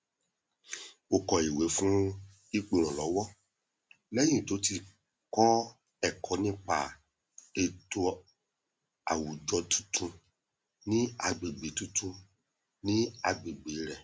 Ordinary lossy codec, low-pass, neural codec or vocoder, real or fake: none; none; none; real